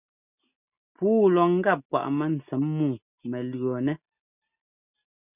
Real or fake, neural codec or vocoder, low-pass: real; none; 3.6 kHz